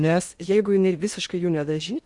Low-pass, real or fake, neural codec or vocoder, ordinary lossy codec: 10.8 kHz; fake; codec, 16 kHz in and 24 kHz out, 0.6 kbps, FocalCodec, streaming, 2048 codes; Opus, 64 kbps